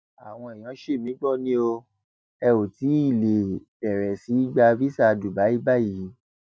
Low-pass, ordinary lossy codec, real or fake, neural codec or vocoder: none; none; real; none